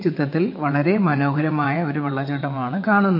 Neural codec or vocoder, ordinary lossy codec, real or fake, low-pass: vocoder, 22.05 kHz, 80 mel bands, WaveNeXt; MP3, 32 kbps; fake; 5.4 kHz